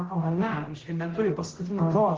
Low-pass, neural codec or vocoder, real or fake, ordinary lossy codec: 7.2 kHz; codec, 16 kHz, 0.5 kbps, X-Codec, HuBERT features, trained on general audio; fake; Opus, 16 kbps